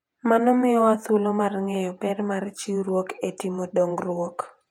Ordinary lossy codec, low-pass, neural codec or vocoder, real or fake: none; 19.8 kHz; vocoder, 48 kHz, 128 mel bands, Vocos; fake